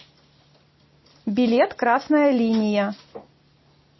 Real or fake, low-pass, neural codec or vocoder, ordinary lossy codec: real; 7.2 kHz; none; MP3, 24 kbps